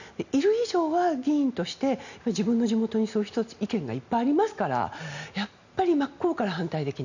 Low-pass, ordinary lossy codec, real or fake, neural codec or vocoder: 7.2 kHz; none; real; none